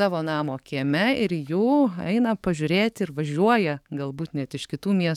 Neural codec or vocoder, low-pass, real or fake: codec, 44.1 kHz, 7.8 kbps, DAC; 19.8 kHz; fake